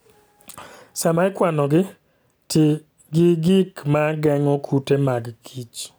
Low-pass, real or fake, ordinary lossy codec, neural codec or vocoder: none; real; none; none